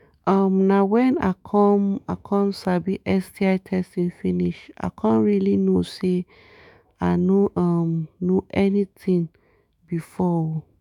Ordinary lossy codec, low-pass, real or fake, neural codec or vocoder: none; 19.8 kHz; fake; autoencoder, 48 kHz, 128 numbers a frame, DAC-VAE, trained on Japanese speech